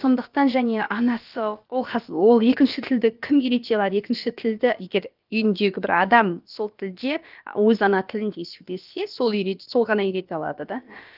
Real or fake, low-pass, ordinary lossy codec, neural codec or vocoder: fake; 5.4 kHz; Opus, 24 kbps; codec, 16 kHz, about 1 kbps, DyCAST, with the encoder's durations